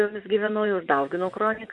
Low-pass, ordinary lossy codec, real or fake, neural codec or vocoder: 10.8 kHz; MP3, 64 kbps; fake; vocoder, 24 kHz, 100 mel bands, Vocos